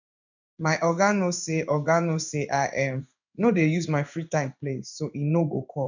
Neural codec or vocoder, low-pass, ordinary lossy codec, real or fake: codec, 16 kHz in and 24 kHz out, 1 kbps, XY-Tokenizer; 7.2 kHz; none; fake